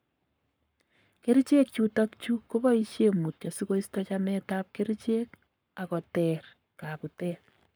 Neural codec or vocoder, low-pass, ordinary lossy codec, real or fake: codec, 44.1 kHz, 7.8 kbps, Pupu-Codec; none; none; fake